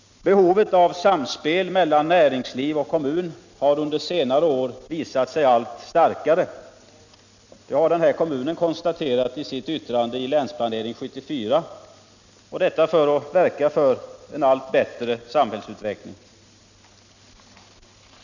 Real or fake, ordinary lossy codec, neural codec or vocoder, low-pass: real; none; none; 7.2 kHz